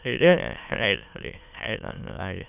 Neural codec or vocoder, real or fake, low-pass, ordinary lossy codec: autoencoder, 22.05 kHz, a latent of 192 numbers a frame, VITS, trained on many speakers; fake; 3.6 kHz; none